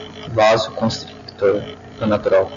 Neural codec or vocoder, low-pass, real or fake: none; 7.2 kHz; real